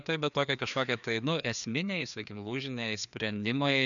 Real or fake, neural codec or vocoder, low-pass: fake; codec, 16 kHz, 2 kbps, FreqCodec, larger model; 7.2 kHz